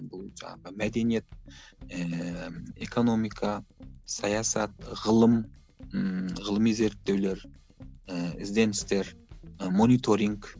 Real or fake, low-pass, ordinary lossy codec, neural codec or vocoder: real; none; none; none